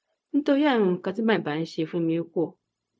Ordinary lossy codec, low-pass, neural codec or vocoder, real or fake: none; none; codec, 16 kHz, 0.4 kbps, LongCat-Audio-Codec; fake